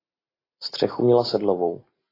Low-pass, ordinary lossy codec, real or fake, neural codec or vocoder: 5.4 kHz; AAC, 24 kbps; real; none